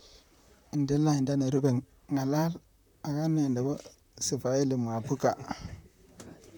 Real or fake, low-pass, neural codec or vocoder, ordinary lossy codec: fake; none; vocoder, 44.1 kHz, 128 mel bands, Pupu-Vocoder; none